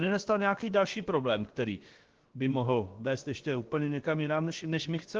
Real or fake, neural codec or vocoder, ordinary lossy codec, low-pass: fake; codec, 16 kHz, about 1 kbps, DyCAST, with the encoder's durations; Opus, 16 kbps; 7.2 kHz